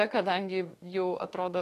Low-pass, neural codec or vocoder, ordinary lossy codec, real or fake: 14.4 kHz; autoencoder, 48 kHz, 32 numbers a frame, DAC-VAE, trained on Japanese speech; AAC, 48 kbps; fake